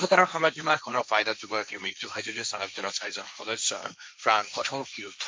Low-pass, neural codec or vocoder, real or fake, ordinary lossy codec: none; codec, 16 kHz, 1.1 kbps, Voila-Tokenizer; fake; none